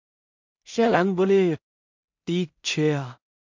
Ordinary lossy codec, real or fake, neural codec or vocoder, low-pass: MP3, 64 kbps; fake; codec, 16 kHz in and 24 kHz out, 0.4 kbps, LongCat-Audio-Codec, two codebook decoder; 7.2 kHz